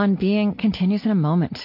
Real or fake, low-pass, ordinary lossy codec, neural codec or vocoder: real; 5.4 kHz; MP3, 32 kbps; none